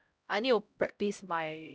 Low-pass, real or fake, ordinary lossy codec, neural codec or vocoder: none; fake; none; codec, 16 kHz, 0.5 kbps, X-Codec, HuBERT features, trained on LibriSpeech